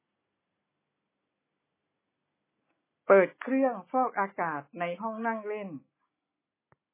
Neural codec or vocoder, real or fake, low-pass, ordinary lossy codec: none; real; 3.6 kHz; MP3, 16 kbps